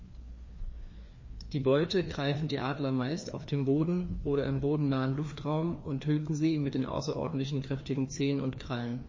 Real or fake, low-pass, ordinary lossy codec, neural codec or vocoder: fake; 7.2 kHz; MP3, 32 kbps; codec, 16 kHz, 2 kbps, FreqCodec, larger model